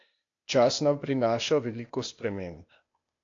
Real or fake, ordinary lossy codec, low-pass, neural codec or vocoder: fake; AAC, 48 kbps; 7.2 kHz; codec, 16 kHz, 0.8 kbps, ZipCodec